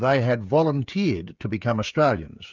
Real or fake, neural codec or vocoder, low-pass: fake; codec, 16 kHz, 16 kbps, FreqCodec, smaller model; 7.2 kHz